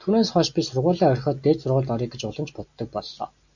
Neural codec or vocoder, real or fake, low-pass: none; real; 7.2 kHz